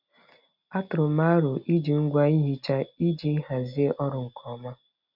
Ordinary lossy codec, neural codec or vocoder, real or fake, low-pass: none; none; real; 5.4 kHz